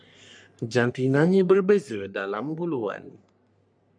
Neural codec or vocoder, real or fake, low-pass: codec, 44.1 kHz, 3.4 kbps, Pupu-Codec; fake; 9.9 kHz